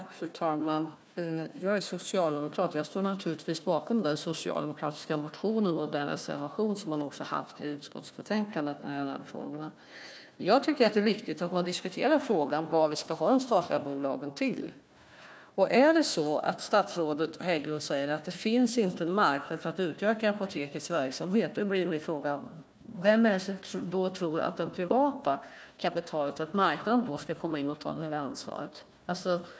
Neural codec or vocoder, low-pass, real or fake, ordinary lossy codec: codec, 16 kHz, 1 kbps, FunCodec, trained on Chinese and English, 50 frames a second; none; fake; none